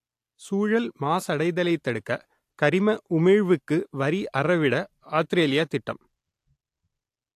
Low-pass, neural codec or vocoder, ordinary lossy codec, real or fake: 14.4 kHz; none; AAC, 64 kbps; real